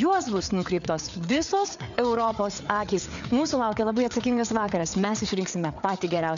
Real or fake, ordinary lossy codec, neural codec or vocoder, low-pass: fake; MP3, 96 kbps; codec, 16 kHz, 4 kbps, FreqCodec, larger model; 7.2 kHz